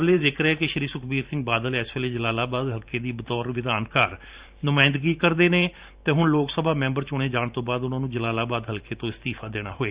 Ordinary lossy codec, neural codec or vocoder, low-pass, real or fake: Opus, 64 kbps; vocoder, 44.1 kHz, 128 mel bands every 512 samples, BigVGAN v2; 3.6 kHz; fake